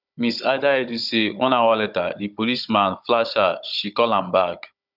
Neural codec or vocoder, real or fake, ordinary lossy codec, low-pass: codec, 16 kHz, 16 kbps, FunCodec, trained on Chinese and English, 50 frames a second; fake; none; 5.4 kHz